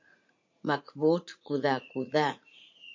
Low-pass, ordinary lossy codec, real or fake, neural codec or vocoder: 7.2 kHz; MP3, 48 kbps; fake; vocoder, 44.1 kHz, 80 mel bands, Vocos